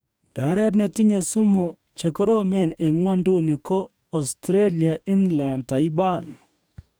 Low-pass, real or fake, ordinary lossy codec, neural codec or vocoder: none; fake; none; codec, 44.1 kHz, 2.6 kbps, DAC